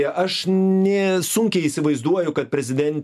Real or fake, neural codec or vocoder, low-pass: real; none; 14.4 kHz